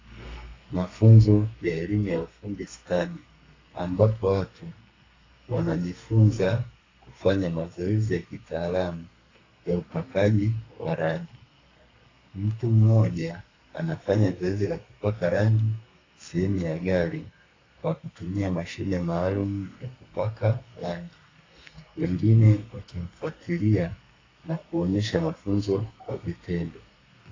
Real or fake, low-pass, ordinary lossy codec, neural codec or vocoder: fake; 7.2 kHz; AAC, 32 kbps; codec, 32 kHz, 1.9 kbps, SNAC